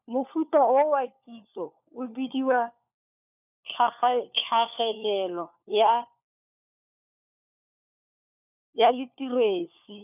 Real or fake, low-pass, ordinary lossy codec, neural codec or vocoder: fake; 3.6 kHz; none; codec, 16 kHz, 4 kbps, FunCodec, trained on LibriTTS, 50 frames a second